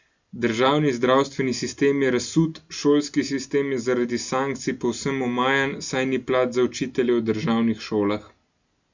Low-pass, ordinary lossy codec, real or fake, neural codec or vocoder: 7.2 kHz; Opus, 64 kbps; real; none